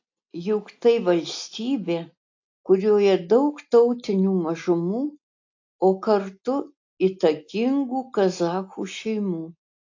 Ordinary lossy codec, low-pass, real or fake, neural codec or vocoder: AAC, 48 kbps; 7.2 kHz; real; none